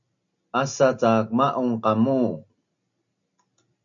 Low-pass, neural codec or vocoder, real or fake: 7.2 kHz; none; real